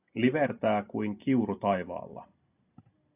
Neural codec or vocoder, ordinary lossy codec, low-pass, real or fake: none; AAC, 32 kbps; 3.6 kHz; real